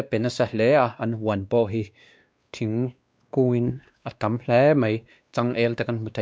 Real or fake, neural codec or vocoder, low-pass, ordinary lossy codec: fake; codec, 16 kHz, 2 kbps, X-Codec, WavLM features, trained on Multilingual LibriSpeech; none; none